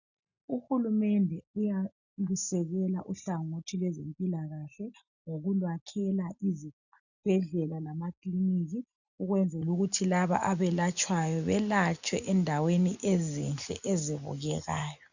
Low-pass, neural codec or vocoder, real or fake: 7.2 kHz; none; real